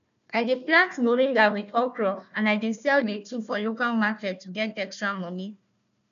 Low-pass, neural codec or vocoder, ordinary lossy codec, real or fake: 7.2 kHz; codec, 16 kHz, 1 kbps, FunCodec, trained on Chinese and English, 50 frames a second; none; fake